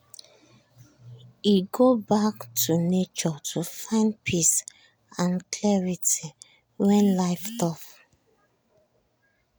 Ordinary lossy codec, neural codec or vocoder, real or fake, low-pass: none; none; real; none